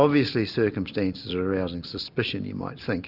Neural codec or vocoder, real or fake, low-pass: none; real; 5.4 kHz